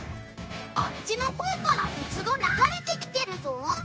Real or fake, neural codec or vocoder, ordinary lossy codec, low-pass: fake; codec, 16 kHz, 0.9 kbps, LongCat-Audio-Codec; none; none